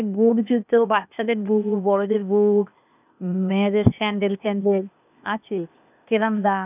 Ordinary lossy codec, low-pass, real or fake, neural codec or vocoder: none; 3.6 kHz; fake; codec, 16 kHz, 0.8 kbps, ZipCodec